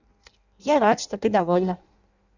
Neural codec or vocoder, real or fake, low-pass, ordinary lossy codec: codec, 16 kHz in and 24 kHz out, 0.6 kbps, FireRedTTS-2 codec; fake; 7.2 kHz; none